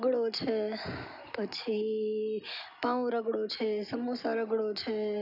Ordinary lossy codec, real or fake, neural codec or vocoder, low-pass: none; real; none; 5.4 kHz